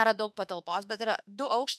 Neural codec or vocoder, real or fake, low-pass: autoencoder, 48 kHz, 32 numbers a frame, DAC-VAE, trained on Japanese speech; fake; 14.4 kHz